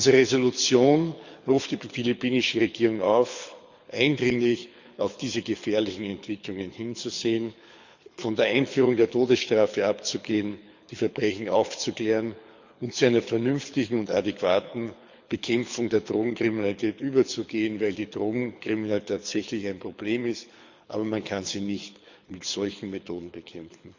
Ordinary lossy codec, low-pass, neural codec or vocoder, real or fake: Opus, 64 kbps; 7.2 kHz; codec, 24 kHz, 6 kbps, HILCodec; fake